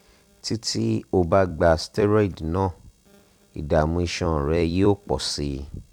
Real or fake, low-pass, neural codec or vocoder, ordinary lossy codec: fake; 19.8 kHz; vocoder, 44.1 kHz, 128 mel bands every 256 samples, BigVGAN v2; none